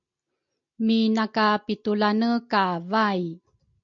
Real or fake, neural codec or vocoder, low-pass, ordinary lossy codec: real; none; 7.2 kHz; MP3, 48 kbps